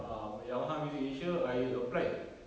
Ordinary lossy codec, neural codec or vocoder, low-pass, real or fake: none; none; none; real